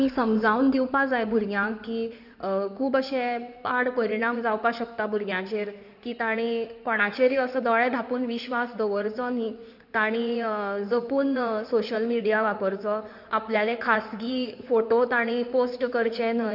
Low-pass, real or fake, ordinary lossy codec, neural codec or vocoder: 5.4 kHz; fake; none; codec, 16 kHz in and 24 kHz out, 2.2 kbps, FireRedTTS-2 codec